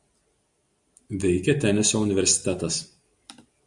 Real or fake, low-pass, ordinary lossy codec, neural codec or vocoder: real; 10.8 kHz; Opus, 64 kbps; none